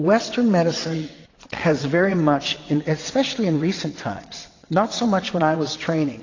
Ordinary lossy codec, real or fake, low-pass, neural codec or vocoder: AAC, 32 kbps; fake; 7.2 kHz; vocoder, 22.05 kHz, 80 mel bands, WaveNeXt